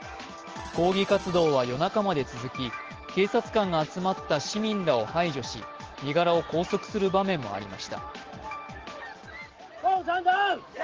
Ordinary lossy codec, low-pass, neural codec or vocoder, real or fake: Opus, 16 kbps; 7.2 kHz; none; real